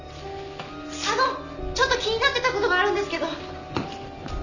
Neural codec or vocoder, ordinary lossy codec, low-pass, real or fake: none; none; 7.2 kHz; real